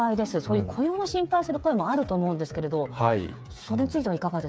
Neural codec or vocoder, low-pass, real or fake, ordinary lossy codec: codec, 16 kHz, 8 kbps, FreqCodec, smaller model; none; fake; none